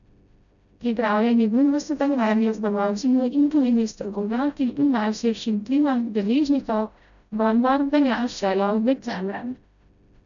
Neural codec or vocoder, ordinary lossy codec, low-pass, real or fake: codec, 16 kHz, 0.5 kbps, FreqCodec, smaller model; none; 7.2 kHz; fake